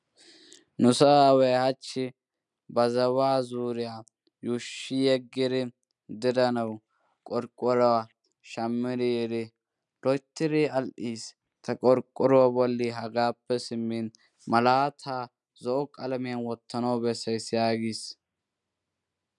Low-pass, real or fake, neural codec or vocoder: 10.8 kHz; real; none